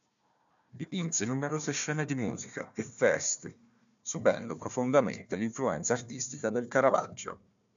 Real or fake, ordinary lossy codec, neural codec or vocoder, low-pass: fake; MP3, 64 kbps; codec, 16 kHz, 1 kbps, FunCodec, trained on Chinese and English, 50 frames a second; 7.2 kHz